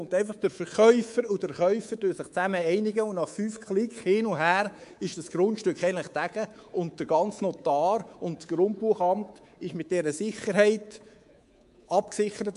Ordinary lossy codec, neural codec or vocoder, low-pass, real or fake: MP3, 64 kbps; codec, 24 kHz, 3.1 kbps, DualCodec; 10.8 kHz; fake